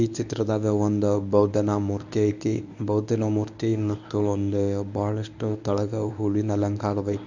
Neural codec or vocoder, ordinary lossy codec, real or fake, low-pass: codec, 24 kHz, 0.9 kbps, WavTokenizer, medium speech release version 1; none; fake; 7.2 kHz